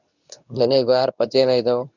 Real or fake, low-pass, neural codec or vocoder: fake; 7.2 kHz; codec, 24 kHz, 0.9 kbps, WavTokenizer, medium speech release version 2